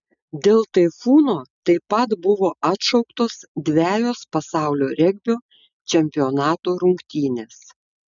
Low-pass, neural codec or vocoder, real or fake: 7.2 kHz; none; real